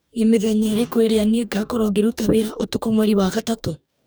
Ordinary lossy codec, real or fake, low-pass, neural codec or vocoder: none; fake; none; codec, 44.1 kHz, 2.6 kbps, DAC